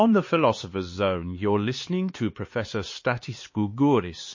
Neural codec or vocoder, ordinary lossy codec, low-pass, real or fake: codec, 16 kHz, 4 kbps, X-Codec, HuBERT features, trained on LibriSpeech; MP3, 32 kbps; 7.2 kHz; fake